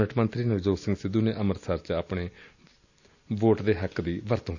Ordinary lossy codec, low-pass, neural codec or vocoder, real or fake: none; 7.2 kHz; none; real